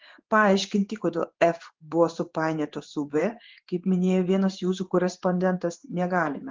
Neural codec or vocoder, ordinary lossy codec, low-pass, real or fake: codec, 16 kHz in and 24 kHz out, 1 kbps, XY-Tokenizer; Opus, 16 kbps; 7.2 kHz; fake